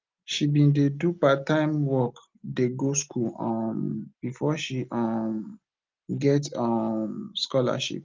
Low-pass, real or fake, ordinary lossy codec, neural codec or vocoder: 7.2 kHz; real; Opus, 32 kbps; none